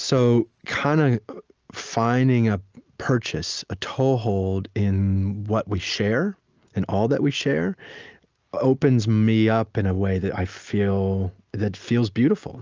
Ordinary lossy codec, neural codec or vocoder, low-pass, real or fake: Opus, 24 kbps; none; 7.2 kHz; real